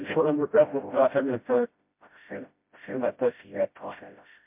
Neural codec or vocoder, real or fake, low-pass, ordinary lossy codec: codec, 16 kHz, 0.5 kbps, FreqCodec, smaller model; fake; 3.6 kHz; MP3, 32 kbps